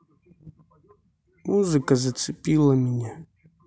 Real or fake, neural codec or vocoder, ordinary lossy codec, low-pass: real; none; none; none